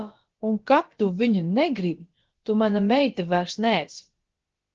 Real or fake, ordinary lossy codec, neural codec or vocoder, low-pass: fake; Opus, 16 kbps; codec, 16 kHz, about 1 kbps, DyCAST, with the encoder's durations; 7.2 kHz